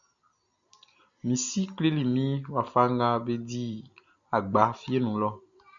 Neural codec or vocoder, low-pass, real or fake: none; 7.2 kHz; real